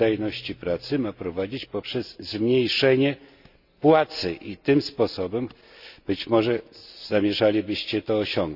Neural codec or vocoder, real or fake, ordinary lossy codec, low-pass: none; real; none; 5.4 kHz